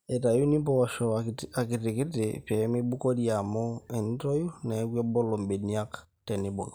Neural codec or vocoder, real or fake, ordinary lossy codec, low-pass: none; real; none; none